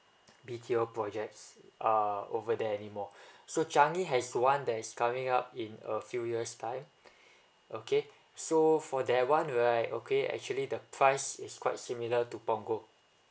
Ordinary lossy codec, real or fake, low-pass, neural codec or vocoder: none; real; none; none